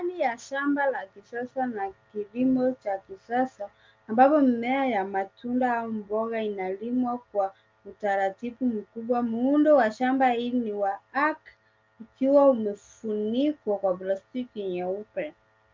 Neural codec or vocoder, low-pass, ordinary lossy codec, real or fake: none; 7.2 kHz; Opus, 24 kbps; real